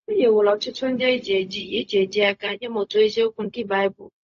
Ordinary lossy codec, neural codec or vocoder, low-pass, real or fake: AAC, 48 kbps; codec, 16 kHz, 0.4 kbps, LongCat-Audio-Codec; 7.2 kHz; fake